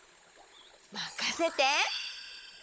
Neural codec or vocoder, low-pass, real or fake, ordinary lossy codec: codec, 16 kHz, 16 kbps, FunCodec, trained on Chinese and English, 50 frames a second; none; fake; none